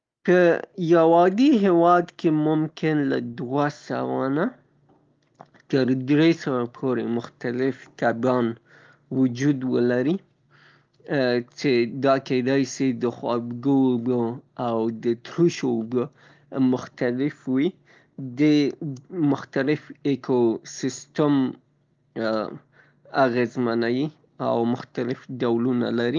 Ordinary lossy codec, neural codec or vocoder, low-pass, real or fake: Opus, 32 kbps; none; 7.2 kHz; real